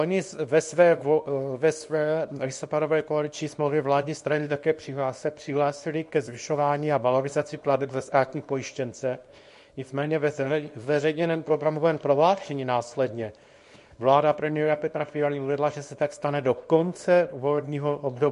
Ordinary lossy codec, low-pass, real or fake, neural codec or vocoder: MP3, 48 kbps; 10.8 kHz; fake; codec, 24 kHz, 0.9 kbps, WavTokenizer, small release